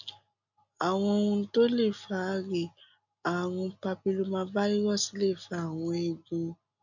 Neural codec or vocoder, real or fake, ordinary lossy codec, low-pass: none; real; none; 7.2 kHz